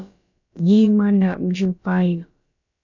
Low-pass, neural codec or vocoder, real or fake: 7.2 kHz; codec, 16 kHz, about 1 kbps, DyCAST, with the encoder's durations; fake